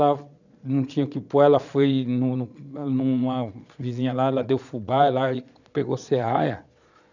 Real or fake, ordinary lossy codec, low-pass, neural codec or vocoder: fake; none; 7.2 kHz; vocoder, 22.05 kHz, 80 mel bands, Vocos